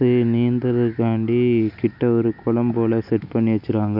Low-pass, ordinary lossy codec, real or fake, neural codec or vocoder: 5.4 kHz; none; real; none